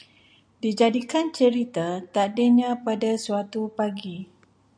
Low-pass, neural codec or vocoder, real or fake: 9.9 kHz; none; real